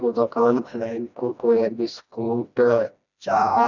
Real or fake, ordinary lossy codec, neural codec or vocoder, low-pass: fake; none; codec, 16 kHz, 1 kbps, FreqCodec, smaller model; 7.2 kHz